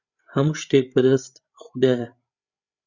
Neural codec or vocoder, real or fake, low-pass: codec, 16 kHz, 16 kbps, FreqCodec, larger model; fake; 7.2 kHz